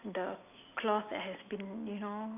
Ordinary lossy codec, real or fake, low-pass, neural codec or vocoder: none; real; 3.6 kHz; none